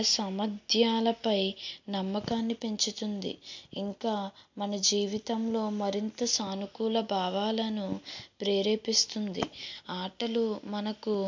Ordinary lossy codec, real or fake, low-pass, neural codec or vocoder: MP3, 48 kbps; real; 7.2 kHz; none